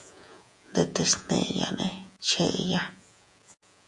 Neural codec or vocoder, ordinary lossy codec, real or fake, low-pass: vocoder, 48 kHz, 128 mel bands, Vocos; AAC, 64 kbps; fake; 10.8 kHz